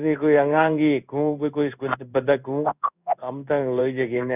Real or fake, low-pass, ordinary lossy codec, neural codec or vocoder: fake; 3.6 kHz; none; codec, 16 kHz in and 24 kHz out, 1 kbps, XY-Tokenizer